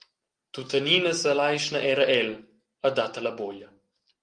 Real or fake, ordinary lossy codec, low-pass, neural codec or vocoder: real; Opus, 24 kbps; 9.9 kHz; none